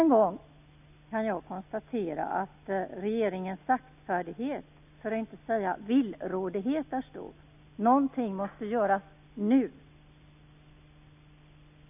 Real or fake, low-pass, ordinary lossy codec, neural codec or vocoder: real; 3.6 kHz; none; none